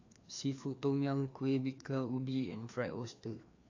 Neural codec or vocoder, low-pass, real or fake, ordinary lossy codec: codec, 16 kHz, 2 kbps, FreqCodec, larger model; 7.2 kHz; fake; AAC, 48 kbps